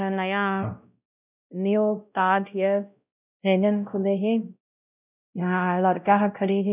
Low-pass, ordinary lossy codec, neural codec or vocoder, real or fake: 3.6 kHz; none; codec, 16 kHz, 0.5 kbps, X-Codec, WavLM features, trained on Multilingual LibriSpeech; fake